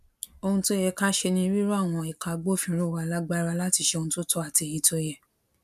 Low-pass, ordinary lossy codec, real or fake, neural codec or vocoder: 14.4 kHz; none; real; none